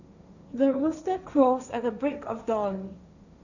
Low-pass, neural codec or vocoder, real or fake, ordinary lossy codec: 7.2 kHz; codec, 16 kHz, 1.1 kbps, Voila-Tokenizer; fake; none